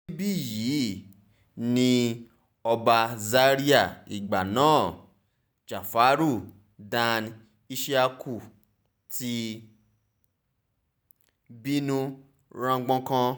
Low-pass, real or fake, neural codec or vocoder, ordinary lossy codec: none; real; none; none